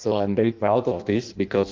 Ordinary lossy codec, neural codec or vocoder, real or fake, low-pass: Opus, 24 kbps; codec, 16 kHz in and 24 kHz out, 0.6 kbps, FireRedTTS-2 codec; fake; 7.2 kHz